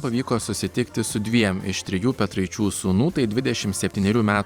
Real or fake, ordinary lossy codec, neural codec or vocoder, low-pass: fake; Opus, 64 kbps; vocoder, 44.1 kHz, 128 mel bands every 512 samples, BigVGAN v2; 19.8 kHz